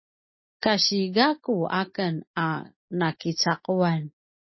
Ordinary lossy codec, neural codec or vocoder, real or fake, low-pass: MP3, 24 kbps; none; real; 7.2 kHz